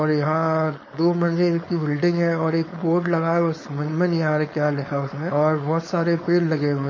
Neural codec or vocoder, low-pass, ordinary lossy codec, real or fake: codec, 16 kHz, 4.8 kbps, FACodec; 7.2 kHz; MP3, 32 kbps; fake